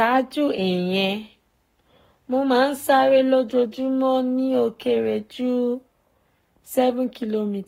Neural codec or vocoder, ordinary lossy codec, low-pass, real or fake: codec, 44.1 kHz, 7.8 kbps, Pupu-Codec; AAC, 48 kbps; 19.8 kHz; fake